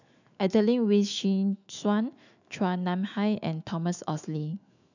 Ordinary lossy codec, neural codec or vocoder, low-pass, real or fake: none; autoencoder, 48 kHz, 128 numbers a frame, DAC-VAE, trained on Japanese speech; 7.2 kHz; fake